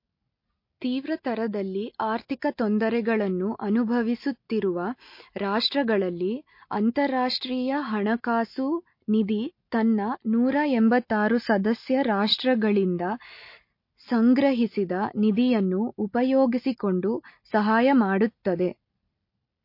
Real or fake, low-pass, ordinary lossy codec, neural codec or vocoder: real; 5.4 kHz; MP3, 32 kbps; none